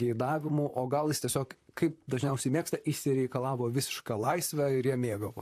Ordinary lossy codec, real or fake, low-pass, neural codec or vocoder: AAC, 64 kbps; fake; 14.4 kHz; vocoder, 44.1 kHz, 128 mel bands, Pupu-Vocoder